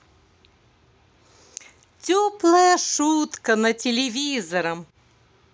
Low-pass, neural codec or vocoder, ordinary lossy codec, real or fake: none; none; none; real